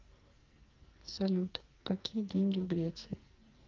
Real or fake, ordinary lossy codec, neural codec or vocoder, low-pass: fake; Opus, 24 kbps; codec, 44.1 kHz, 2.6 kbps, SNAC; 7.2 kHz